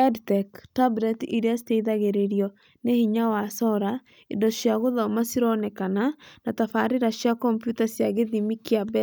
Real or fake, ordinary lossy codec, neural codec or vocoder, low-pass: real; none; none; none